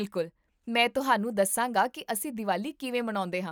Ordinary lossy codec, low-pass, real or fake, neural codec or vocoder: none; none; real; none